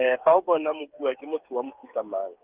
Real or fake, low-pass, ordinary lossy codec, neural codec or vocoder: fake; 3.6 kHz; Opus, 24 kbps; codec, 16 kHz, 8 kbps, FreqCodec, smaller model